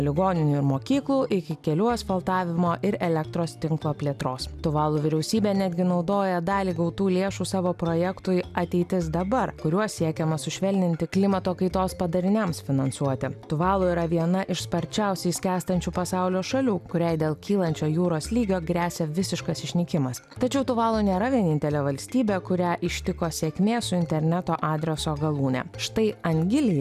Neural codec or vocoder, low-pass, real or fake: none; 14.4 kHz; real